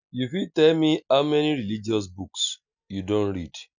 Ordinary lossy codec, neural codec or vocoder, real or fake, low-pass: none; none; real; 7.2 kHz